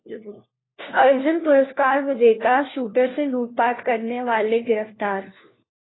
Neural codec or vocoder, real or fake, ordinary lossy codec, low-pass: codec, 16 kHz, 1 kbps, FunCodec, trained on LibriTTS, 50 frames a second; fake; AAC, 16 kbps; 7.2 kHz